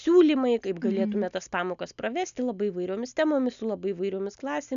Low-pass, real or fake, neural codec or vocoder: 7.2 kHz; real; none